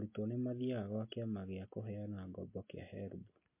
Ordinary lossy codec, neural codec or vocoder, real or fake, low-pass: MP3, 24 kbps; none; real; 3.6 kHz